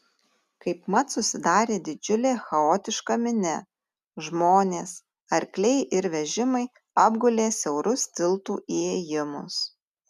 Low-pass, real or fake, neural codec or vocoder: 14.4 kHz; real; none